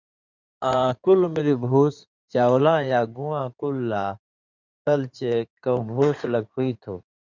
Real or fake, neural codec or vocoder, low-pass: fake; codec, 16 kHz in and 24 kHz out, 2.2 kbps, FireRedTTS-2 codec; 7.2 kHz